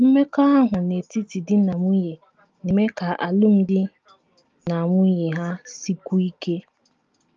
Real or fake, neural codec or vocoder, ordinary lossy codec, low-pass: real; none; Opus, 32 kbps; 7.2 kHz